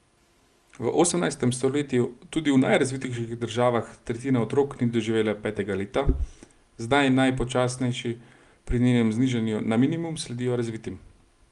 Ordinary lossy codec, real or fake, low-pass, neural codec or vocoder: Opus, 32 kbps; real; 10.8 kHz; none